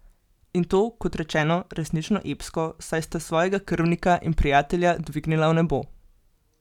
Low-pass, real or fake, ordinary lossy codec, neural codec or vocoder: 19.8 kHz; real; none; none